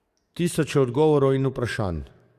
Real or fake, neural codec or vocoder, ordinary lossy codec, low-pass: fake; codec, 44.1 kHz, 7.8 kbps, DAC; Opus, 64 kbps; 14.4 kHz